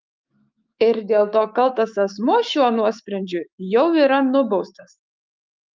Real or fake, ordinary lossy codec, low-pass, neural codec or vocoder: fake; Opus, 24 kbps; 7.2 kHz; autoencoder, 48 kHz, 128 numbers a frame, DAC-VAE, trained on Japanese speech